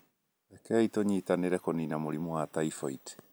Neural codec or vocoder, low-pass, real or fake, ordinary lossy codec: none; none; real; none